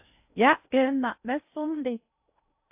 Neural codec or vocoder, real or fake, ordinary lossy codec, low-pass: codec, 16 kHz in and 24 kHz out, 0.6 kbps, FocalCodec, streaming, 2048 codes; fake; AAC, 32 kbps; 3.6 kHz